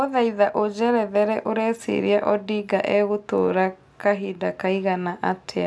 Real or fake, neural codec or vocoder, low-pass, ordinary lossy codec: real; none; none; none